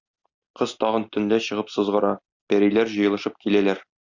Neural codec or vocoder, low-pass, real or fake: none; 7.2 kHz; real